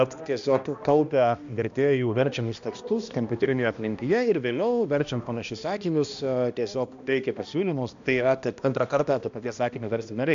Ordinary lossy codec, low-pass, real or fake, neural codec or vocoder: MP3, 64 kbps; 7.2 kHz; fake; codec, 16 kHz, 1 kbps, X-Codec, HuBERT features, trained on balanced general audio